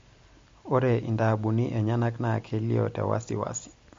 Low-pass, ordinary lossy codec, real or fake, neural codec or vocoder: 7.2 kHz; MP3, 48 kbps; real; none